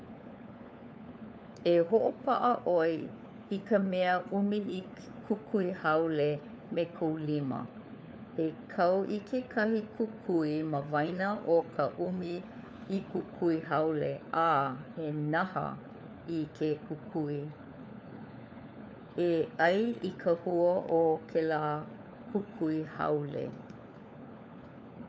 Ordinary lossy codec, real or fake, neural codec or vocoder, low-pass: none; fake; codec, 16 kHz, 4 kbps, FunCodec, trained on LibriTTS, 50 frames a second; none